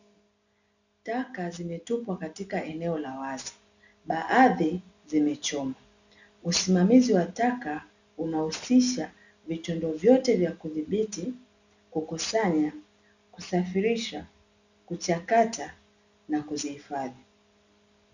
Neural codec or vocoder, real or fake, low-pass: none; real; 7.2 kHz